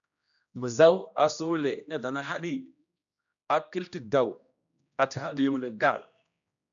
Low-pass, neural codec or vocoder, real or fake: 7.2 kHz; codec, 16 kHz, 1 kbps, X-Codec, HuBERT features, trained on general audio; fake